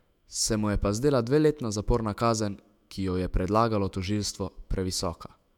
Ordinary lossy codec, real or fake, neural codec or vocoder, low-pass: none; fake; autoencoder, 48 kHz, 128 numbers a frame, DAC-VAE, trained on Japanese speech; 19.8 kHz